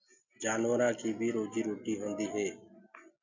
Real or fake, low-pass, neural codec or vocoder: real; 7.2 kHz; none